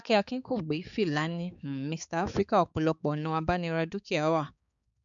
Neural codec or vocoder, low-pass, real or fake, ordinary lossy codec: codec, 16 kHz, 4 kbps, X-Codec, HuBERT features, trained on balanced general audio; 7.2 kHz; fake; none